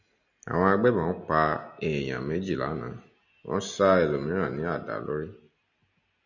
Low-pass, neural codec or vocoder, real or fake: 7.2 kHz; none; real